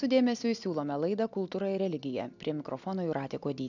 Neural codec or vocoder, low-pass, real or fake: none; 7.2 kHz; real